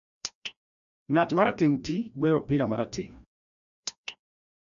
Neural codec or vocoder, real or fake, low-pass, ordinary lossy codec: codec, 16 kHz, 1 kbps, FreqCodec, larger model; fake; 7.2 kHz; none